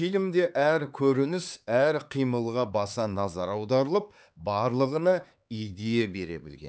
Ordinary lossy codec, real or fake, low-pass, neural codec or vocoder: none; fake; none; codec, 16 kHz, 4 kbps, X-Codec, HuBERT features, trained on LibriSpeech